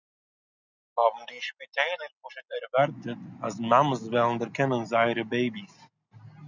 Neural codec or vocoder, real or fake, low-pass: none; real; 7.2 kHz